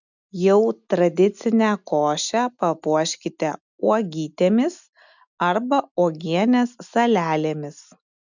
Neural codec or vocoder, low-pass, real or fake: none; 7.2 kHz; real